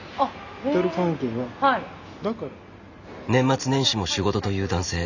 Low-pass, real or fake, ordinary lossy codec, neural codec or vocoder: 7.2 kHz; real; none; none